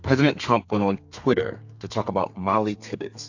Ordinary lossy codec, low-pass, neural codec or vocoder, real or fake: AAC, 48 kbps; 7.2 kHz; codec, 32 kHz, 1.9 kbps, SNAC; fake